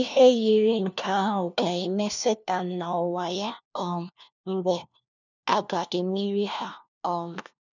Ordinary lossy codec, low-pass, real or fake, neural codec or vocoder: none; 7.2 kHz; fake; codec, 16 kHz, 1 kbps, FunCodec, trained on LibriTTS, 50 frames a second